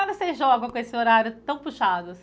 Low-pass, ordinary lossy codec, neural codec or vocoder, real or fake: none; none; none; real